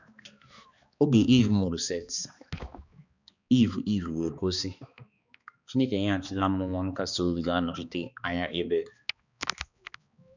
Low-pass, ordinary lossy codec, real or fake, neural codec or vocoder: 7.2 kHz; none; fake; codec, 16 kHz, 2 kbps, X-Codec, HuBERT features, trained on balanced general audio